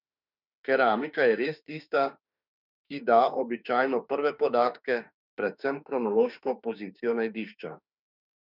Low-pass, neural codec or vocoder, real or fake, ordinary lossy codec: 5.4 kHz; autoencoder, 48 kHz, 32 numbers a frame, DAC-VAE, trained on Japanese speech; fake; none